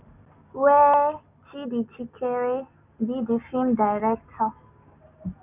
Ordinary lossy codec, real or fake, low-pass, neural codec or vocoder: none; real; 3.6 kHz; none